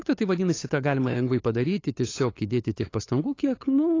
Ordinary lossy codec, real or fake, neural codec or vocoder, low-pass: AAC, 32 kbps; fake; codec, 16 kHz, 8 kbps, FunCodec, trained on LibriTTS, 25 frames a second; 7.2 kHz